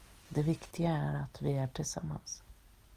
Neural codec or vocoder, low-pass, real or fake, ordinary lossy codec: none; 14.4 kHz; real; Opus, 24 kbps